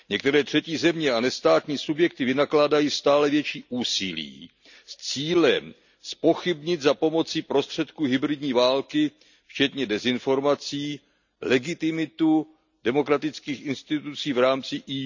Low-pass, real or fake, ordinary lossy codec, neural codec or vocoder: 7.2 kHz; real; none; none